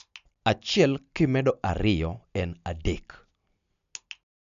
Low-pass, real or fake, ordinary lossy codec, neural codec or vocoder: 7.2 kHz; real; none; none